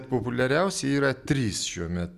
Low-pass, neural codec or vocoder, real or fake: 14.4 kHz; none; real